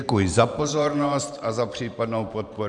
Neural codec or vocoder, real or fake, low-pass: vocoder, 44.1 kHz, 128 mel bands every 512 samples, BigVGAN v2; fake; 10.8 kHz